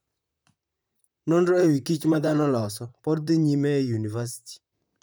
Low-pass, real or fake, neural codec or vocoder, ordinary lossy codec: none; fake; vocoder, 44.1 kHz, 128 mel bands, Pupu-Vocoder; none